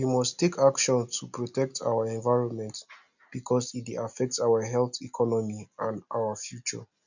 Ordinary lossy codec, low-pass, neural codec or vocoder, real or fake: none; 7.2 kHz; none; real